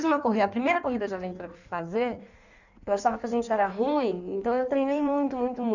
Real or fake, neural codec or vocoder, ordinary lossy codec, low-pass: fake; codec, 16 kHz in and 24 kHz out, 1.1 kbps, FireRedTTS-2 codec; none; 7.2 kHz